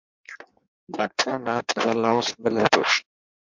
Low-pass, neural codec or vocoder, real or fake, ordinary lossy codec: 7.2 kHz; codec, 16 kHz in and 24 kHz out, 1.1 kbps, FireRedTTS-2 codec; fake; MP3, 64 kbps